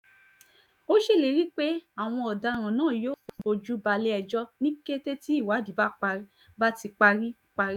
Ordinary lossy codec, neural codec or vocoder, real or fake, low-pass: none; autoencoder, 48 kHz, 128 numbers a frame, DAC-VAE, trained on Japanese speech; fake; 19.8 kHz